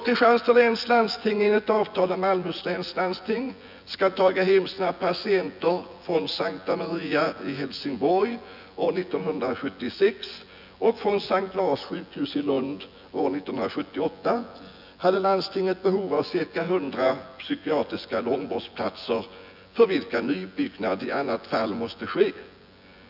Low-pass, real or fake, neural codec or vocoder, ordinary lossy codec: 5.4 kHz; fake; vocoder, 24 kHz, 100 mel bands, Vocos; none